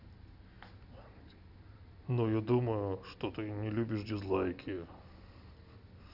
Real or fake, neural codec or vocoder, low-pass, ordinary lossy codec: real; none; 5.4 kHz; none